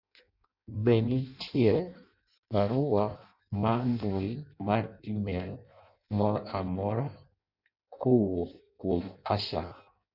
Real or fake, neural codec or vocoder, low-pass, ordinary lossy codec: fake; codec, 16 kHz in and 24 kHz out, 0.6 kbps, FireRedTTS-2 codec; 5.4 kHz; none